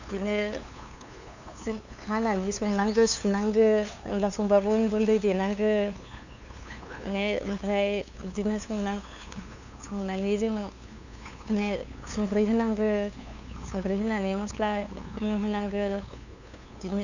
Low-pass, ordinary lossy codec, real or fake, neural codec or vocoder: 7.2 kHz; none; fake; codec, 16 kHz, 2 kbps, FunCodec, trained on LibriTTS, 25 frames a second